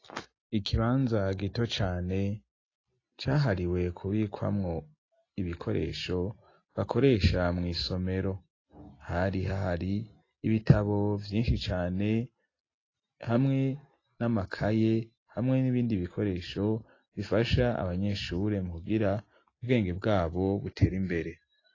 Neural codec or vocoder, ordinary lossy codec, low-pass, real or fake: none; AAC, 32 kbps; 7.2 kHz; real